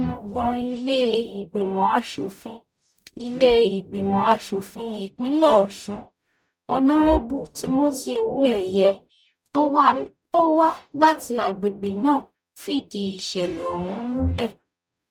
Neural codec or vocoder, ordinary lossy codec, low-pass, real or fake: codec, 44.1 kHz, 0.9 kbps, DAC; none; 19.8 kHz; fake